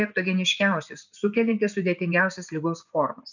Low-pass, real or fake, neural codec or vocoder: 7.2 kHz; fake; vocoder, 24 kHz, 100 mel bands, Vocos